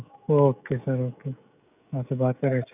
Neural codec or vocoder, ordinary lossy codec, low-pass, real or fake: codec, 16 kHz, 16 kbps, FreqCodec, smaller model; none; 3.6 kHz; fake